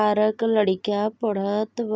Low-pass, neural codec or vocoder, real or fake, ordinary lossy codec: none; none; real; none